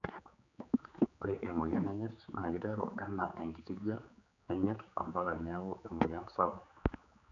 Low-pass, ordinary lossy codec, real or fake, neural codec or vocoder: 7.2 kHz; none; fake; codec, 16 kHz, 4 kbps, X-Codec, HuBERT features, trained on general audio